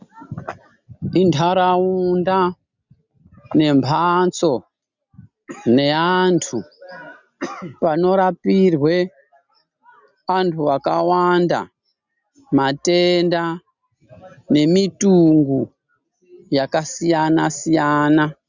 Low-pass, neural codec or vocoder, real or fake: 7.2 kHz; none; real